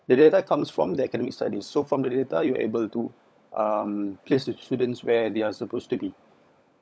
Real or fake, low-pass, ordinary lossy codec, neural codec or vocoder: fake; none; none; codec, 16 kHz, 16 kbps, FunCodec, trained on LibriTTS, 50 frames a second